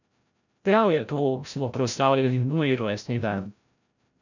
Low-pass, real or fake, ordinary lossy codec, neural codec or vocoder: 7.2 kHz; fake; none; codec, 16 kHz, 0.5 kbps, FreqCodec, larger model